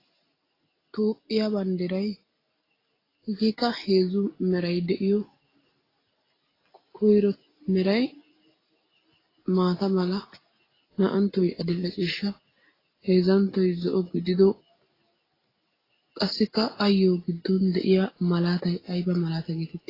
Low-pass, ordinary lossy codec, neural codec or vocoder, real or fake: 5.4 kHz; AAC, 24 kbps; none; real